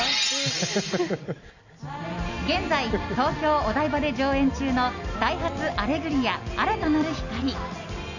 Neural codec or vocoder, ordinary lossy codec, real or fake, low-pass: none; none; real; 7.2 kHz